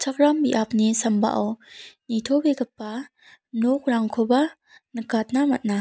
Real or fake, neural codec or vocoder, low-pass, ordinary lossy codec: real; none; none; none